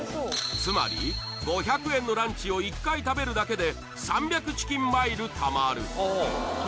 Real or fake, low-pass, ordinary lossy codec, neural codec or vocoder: real; none; none; none